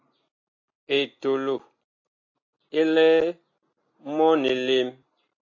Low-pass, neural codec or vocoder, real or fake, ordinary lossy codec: 7.2 kHz; none; real; AAC, 48 kbps